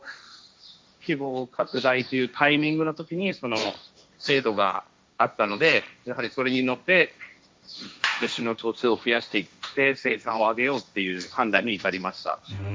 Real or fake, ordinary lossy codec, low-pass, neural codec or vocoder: fake; none; none; codec, 16 kHz, 1.1 kbps, Voila-Tokenizer